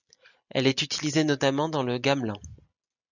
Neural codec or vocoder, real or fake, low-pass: none; real; 7.2 kHz